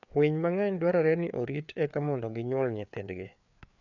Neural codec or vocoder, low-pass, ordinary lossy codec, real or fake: codec, 16 kHz, 4 kbps, FreqCodec, larger model; 7.2 kHz; none; fake